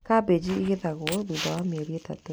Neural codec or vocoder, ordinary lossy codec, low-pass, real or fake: none; none; none; real